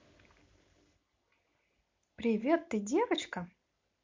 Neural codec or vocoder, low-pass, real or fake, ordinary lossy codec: none; 7.2 kHz; real; MP3, 48 kbps